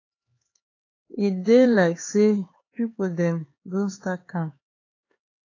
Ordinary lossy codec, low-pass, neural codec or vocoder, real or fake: AAC, 32 kbps; 7.2 kHz; codec, 16 kHz, 4 kbps, X-Codec, HuBERT features, trained on LibriSpeech; fake